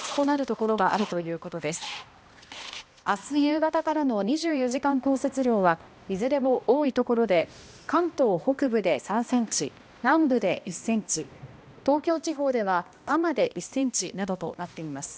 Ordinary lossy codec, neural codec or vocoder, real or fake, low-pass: none; codec, 16 kHz, 1 kbps, X-Codec, HuBERT features, trained on balanced general audio; fake; none